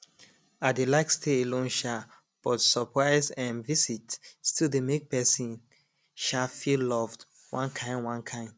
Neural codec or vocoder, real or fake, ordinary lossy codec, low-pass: none; real; none; none